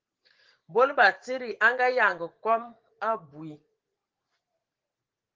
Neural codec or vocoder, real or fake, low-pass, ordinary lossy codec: vocoder, 44.1 kHz, 80 mel bands, Vocos; fake; 7.2 kHz; Opus, 16 kbps